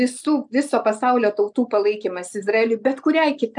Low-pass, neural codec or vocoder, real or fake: 10.8 kHz; none; real